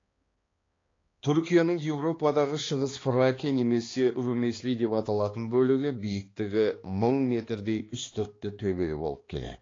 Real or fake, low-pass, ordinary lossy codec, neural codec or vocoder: fake; 7.2 kHz; AAC, 32 kbps; codec, 16 kHz, 2 kbps, X-Codec, HuBERT features, trained on balanced general audio